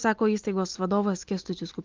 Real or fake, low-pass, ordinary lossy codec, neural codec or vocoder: real; 7.2 kHz; Opus, 24 kbps; none